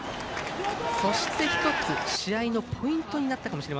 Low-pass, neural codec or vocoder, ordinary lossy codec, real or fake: none; none; none; real